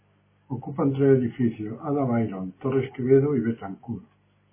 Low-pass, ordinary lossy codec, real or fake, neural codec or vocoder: 3.6 kHz; MP3, 16 kbps; real; none